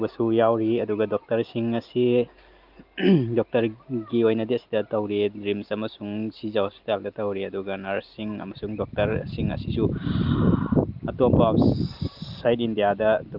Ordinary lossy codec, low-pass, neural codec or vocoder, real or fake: Opus, 32 kbps; 5.4 kHz; none; real